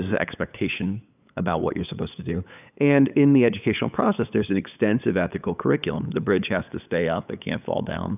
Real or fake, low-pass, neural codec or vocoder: fake; 3.6 kHz; codec, 16 kHz, 8 kbps, FunCodec, trained on LibriTTS, 25 frames a second